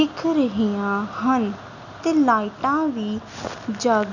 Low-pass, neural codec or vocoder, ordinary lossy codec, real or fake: 7.2 kHz; none; none; real